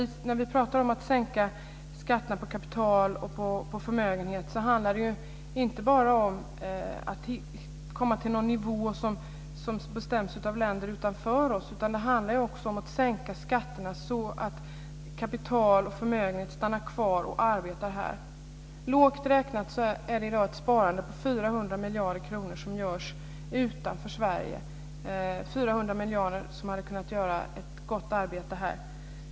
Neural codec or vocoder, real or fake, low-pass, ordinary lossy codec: none; real; none; none